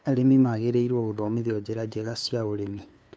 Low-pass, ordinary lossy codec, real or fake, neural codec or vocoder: none; none; fake; codec, 16 kHz, 2 kbps, FunCodec, trained on LibriTTS, 25 frames a second